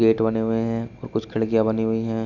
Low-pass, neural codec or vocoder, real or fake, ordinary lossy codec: 7.2 kHz; none; real; none